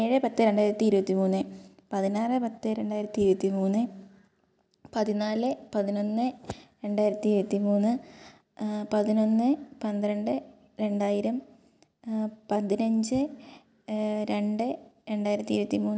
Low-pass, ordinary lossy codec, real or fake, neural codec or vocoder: none; none; real; none